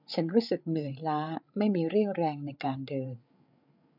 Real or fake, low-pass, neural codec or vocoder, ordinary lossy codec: fake; 5.4 kHz; codec, 16 kHz, 8 kbps, FreqCodec, larger model; none